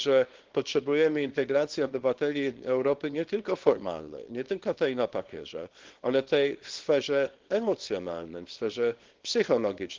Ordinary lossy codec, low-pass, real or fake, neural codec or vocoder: Opus, 16 kbps; 7.2 kHz; fake; codec, 24 kHz, 0.9 kbps, WavTokenizer, small release